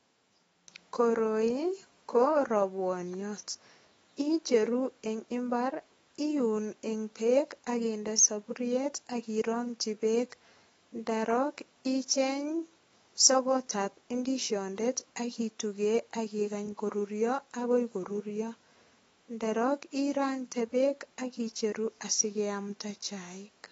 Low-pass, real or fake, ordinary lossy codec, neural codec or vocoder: 19.8 kHz; fake; AAC, 24 kbps; autoencoder, 48 kHz, 128 numbers a frame, DAC-VAE, trained on Japanese speech